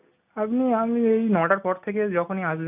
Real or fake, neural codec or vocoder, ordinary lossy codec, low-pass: real; none; none; 3.6 kHz